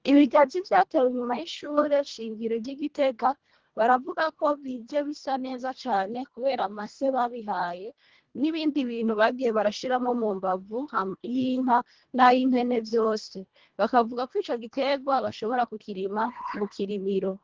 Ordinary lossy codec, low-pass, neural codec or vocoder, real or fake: Opus, 16 kbps; 7.2 kHz; codec, 24 kHz, 1.5 kbps, HILCodec; fake